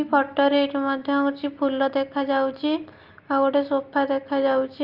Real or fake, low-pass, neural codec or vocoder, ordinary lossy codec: real; 5.4 kHz; none; Opus, 32 kbps